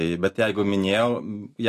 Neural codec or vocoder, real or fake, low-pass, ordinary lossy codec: none; real; 14.4 kHz; AAC, 64 kbps